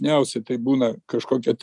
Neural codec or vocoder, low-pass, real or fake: none; 10.8 kHz; real